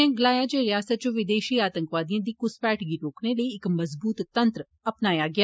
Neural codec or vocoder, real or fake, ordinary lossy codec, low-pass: none; real; none; none